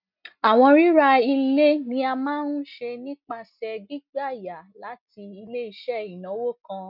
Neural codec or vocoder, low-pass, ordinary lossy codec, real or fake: none; 5.4 kHz; none; real